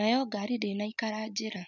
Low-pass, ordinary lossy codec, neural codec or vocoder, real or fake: 7.2 kHz; none; none; real